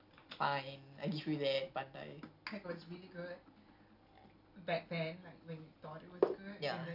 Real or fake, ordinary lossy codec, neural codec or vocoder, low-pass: real; none; none; 5.4 kHz